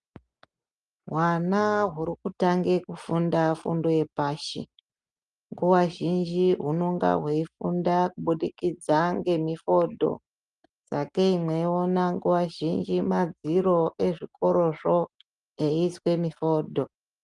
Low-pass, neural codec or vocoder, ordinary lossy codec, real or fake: 10.8 kHz; none; Opus, 32 kbps; real